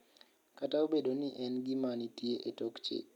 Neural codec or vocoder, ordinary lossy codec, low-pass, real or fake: none; none; 19.8 kHz; real